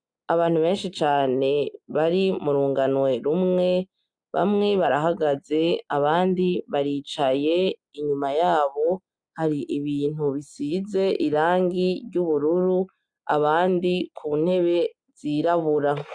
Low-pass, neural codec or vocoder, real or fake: 9.9 kHz; none; real